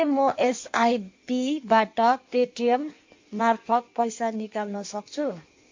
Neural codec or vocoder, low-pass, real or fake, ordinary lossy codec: codec, 16 kHz in and 24 kHz out, 1.1 kbps, FireRedTTS-2 codec; 7.2 kHz; fake; MP3, 48 kbps